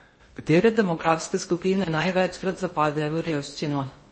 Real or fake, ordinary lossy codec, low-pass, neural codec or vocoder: fake; MP3, 32 kbps; 9.9 kHz; codec, 16 kHz in and 24 kHz out, 0.8 kbps, FocalCodec, streaming, 65536 codes